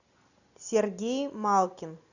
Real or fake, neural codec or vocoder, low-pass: real; none; 7.2 kHz